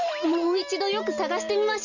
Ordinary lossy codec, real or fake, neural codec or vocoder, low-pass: none; real; none; 7.2 kHz